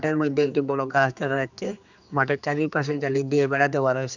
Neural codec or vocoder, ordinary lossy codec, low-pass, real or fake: codec, 16 kHz, 2 kbps, X-Codec, HuBERT features, trained on general audio; none; 7.2 kHz; fake